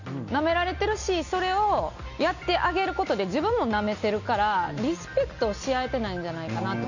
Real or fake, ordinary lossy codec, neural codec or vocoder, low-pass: real; none; none; 7.2 kHz